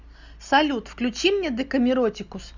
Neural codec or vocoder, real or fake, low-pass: none; real; 7.2 kHz